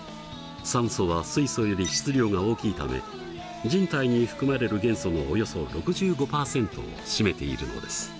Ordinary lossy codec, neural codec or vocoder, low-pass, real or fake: none; none; none; real